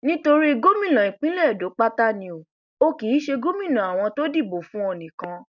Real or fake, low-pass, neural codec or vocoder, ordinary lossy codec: real; 7.2 kHz; none; none